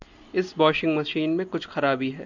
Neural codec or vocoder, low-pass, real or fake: none; 7.2 kHz; real